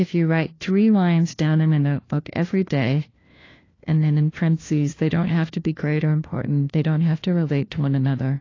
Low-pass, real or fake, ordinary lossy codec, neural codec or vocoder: 7.2 kHz; fake; AAC, 32 kbps; codec, 16 kHz, 1 kbps, FunCodec, trained on LibriTTS, 50 frames a second